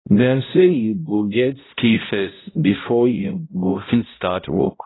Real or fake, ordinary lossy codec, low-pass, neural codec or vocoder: fake; AAC, 16 kbps; 7.2 kHz; codec, 16 kHz, 0.5 kbps, X-Codec, HuBERT features, trained on balanced general audio